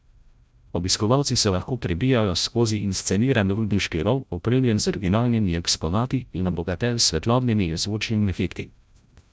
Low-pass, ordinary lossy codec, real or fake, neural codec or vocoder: none; none; fake; codec, 16 kHz, 0.5 kbps, FreqCodec, larger model